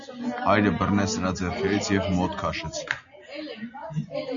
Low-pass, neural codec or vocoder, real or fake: 7.2 kHz; none; real